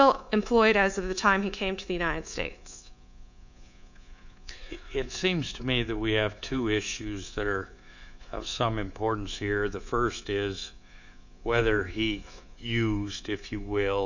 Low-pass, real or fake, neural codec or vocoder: 7.2 kHz; fake; codec, 24 kHz, 3.1 kbps, DualCodec